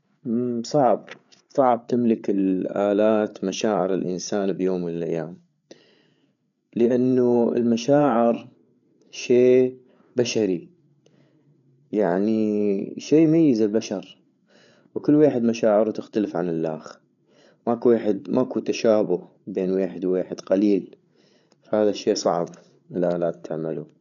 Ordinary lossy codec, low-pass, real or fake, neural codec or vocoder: none; 7.2 kHz; fake; codec, 16 kHz, 8 kbps, FreqCodec, larger model